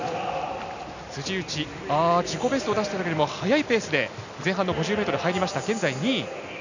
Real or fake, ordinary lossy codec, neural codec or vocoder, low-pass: real; none; none; 7.2 kHz